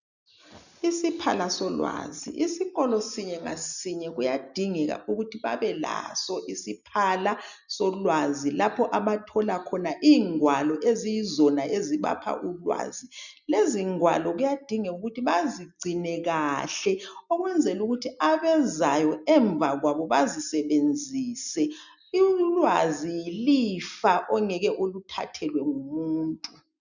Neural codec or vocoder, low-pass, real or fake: none; 7.2 kHz; real